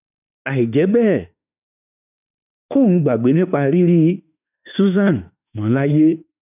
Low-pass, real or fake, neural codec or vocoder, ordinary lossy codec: 3.6 kHz; fake; autoencoder, 48 kHz, 32 numbers a frame, DAC-VAE, trained on Japanese speech; none